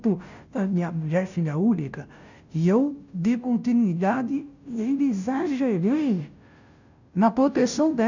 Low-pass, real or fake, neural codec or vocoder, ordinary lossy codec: 7.2 kHz; fake; codec, 16 kHz, 0.5 kbps, FunCodec, trained on Chinese and English, 25 frames a second; none